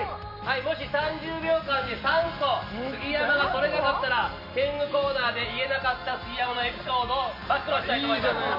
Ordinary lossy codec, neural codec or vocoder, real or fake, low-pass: none; none; real; 5.4 kHz